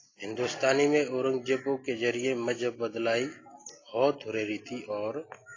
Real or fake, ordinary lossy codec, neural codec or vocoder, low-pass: real; AAC, 32 kbps; none; 7.2 kHz